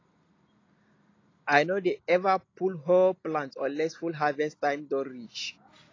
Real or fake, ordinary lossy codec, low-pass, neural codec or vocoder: real; AAC, 32 kbps; 7.2 kHz; none